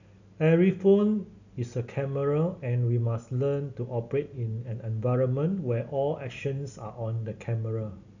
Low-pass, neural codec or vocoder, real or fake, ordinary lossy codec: 7.2 kHz; none; real; none